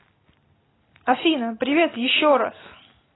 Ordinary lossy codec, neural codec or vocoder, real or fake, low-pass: AAC, 16 kbps; none; real; 7.2 kHz